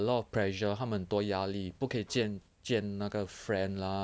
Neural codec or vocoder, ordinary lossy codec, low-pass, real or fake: none; none; none; real